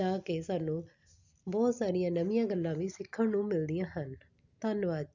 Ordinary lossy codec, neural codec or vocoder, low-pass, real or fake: none; none; 7.2 kHz; real